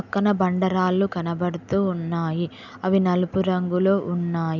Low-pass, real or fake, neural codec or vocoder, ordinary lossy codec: 7.2 kHz; real; none; none